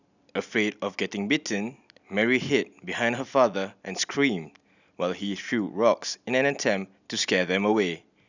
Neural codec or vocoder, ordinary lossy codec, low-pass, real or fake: none; none; 7.2 kHz; real